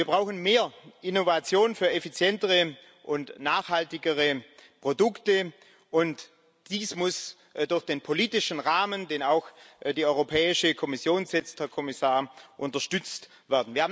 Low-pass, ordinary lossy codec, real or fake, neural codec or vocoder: none; none; real; none